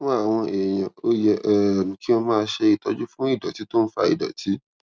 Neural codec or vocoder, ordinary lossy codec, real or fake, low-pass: none; none; real; none